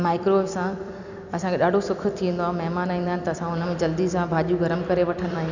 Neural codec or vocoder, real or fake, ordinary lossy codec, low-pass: vocoder, 44.1 kHz, 128 mel bands every 256 samples, BigVGAN v2; fake; none; 7.2 kHz